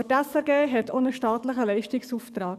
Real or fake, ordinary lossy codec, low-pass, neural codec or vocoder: fake; none; 14.4 kHz; codec, 44.1 kHz, 7.8 kbps, DAC